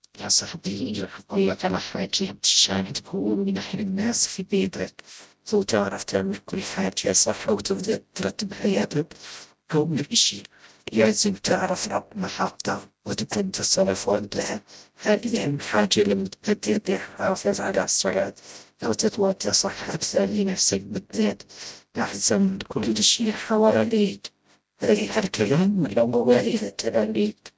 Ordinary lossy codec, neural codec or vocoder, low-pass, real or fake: none; codec, 16 kHz, 0.5 kbps, FreqCodec, smaller model; none; fake